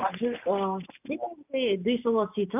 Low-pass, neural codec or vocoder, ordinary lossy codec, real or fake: 3.6 kHz; none; none; real